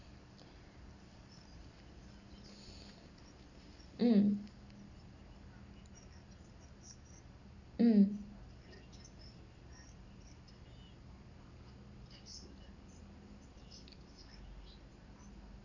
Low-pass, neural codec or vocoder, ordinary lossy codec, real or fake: 7.2 kHz; none; none; real